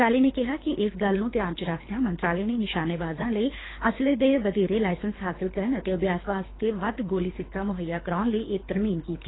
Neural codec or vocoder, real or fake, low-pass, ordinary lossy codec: codec, 24 kHz, 3 kbps, HILCodec; fake; 7.2 kHz; AAC, 16 kbps